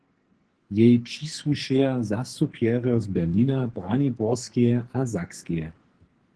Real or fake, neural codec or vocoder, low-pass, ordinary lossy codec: fake; codec, 44.1 kHz, 2.6 kbps, SNAC; 10.8 kHz; Opus, 16 kbps